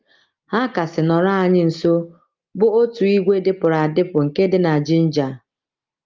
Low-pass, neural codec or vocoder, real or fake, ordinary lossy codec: 7.2 kHz; none; real; Opus, 32 kbps